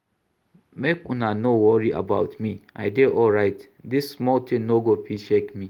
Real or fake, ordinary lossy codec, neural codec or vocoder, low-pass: real; Opus, 24 kbps; none; 19.8 kHz